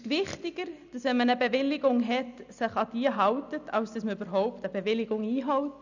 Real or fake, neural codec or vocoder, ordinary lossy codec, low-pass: real; none; none; 7.2 kHz